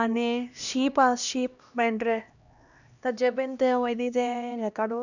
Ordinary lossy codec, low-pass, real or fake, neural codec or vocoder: none; 7.2 kHz; fake; codec, 16 kHz, 1 kbps, X-Codec, HuBERT features, trained on LibriSpeech